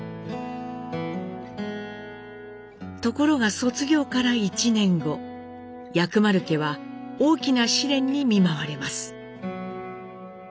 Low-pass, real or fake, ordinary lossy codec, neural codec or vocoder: none; real; none; none